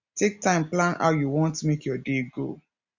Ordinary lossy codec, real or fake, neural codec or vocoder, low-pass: Opus, 64 kbps; real; none; 7.2 kHz